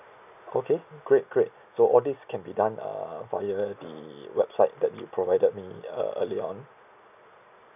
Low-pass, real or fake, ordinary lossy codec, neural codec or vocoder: 3.6 kHz; real; none; none